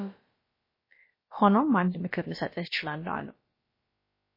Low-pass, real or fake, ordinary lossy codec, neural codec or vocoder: 5.4 kHz; fake; MP3, 24 kbps; codec, 16 kHz, about 1 kbps, DyCAST, with the encoder's durations